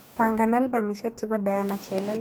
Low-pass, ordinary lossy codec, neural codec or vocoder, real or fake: none; none; codec, 44.1 kHz, 2.6 kbps, DAC; fake